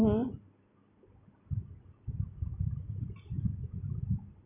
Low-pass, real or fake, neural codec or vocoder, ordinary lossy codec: 3.6 kHz; real; none; none